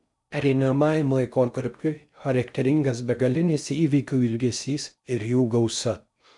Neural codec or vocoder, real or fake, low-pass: codec, 16 kHz in and 24 kHz out, 0.6 kbps, FocalCodec, streaming, 4096 codes; fake; 10.8 kHz